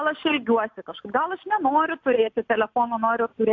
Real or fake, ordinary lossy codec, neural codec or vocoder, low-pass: real; AAC, 48 kbps; none; 7.2 kHz